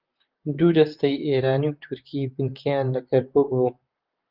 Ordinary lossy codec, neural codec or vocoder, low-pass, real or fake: Opus, 24 kbps; vocoder, 44.1 kHz, 128 mel bands, Pupu-Vocoder; 5.4 kHz; fake